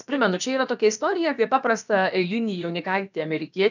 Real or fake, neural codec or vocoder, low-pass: fake; codec, 16 kHz, about 1 kbps, DyCAST, with the encoder's durations; 7.2 kHz